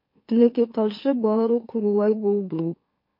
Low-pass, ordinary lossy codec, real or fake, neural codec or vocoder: 5.4 kHz; MP3, 32 kbps; fake; autoencoder, 44.1 kHz, a latent of 192 numbers a frame, MeloTTS